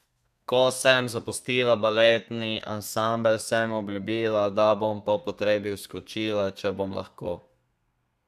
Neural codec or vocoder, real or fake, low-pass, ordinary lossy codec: codec, 32 kHz, 1.9 kbps, SNAC; fake; 14.4 kHz; none